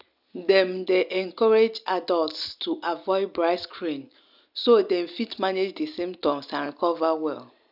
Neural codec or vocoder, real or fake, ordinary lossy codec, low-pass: none; real; none; 5.4 kHz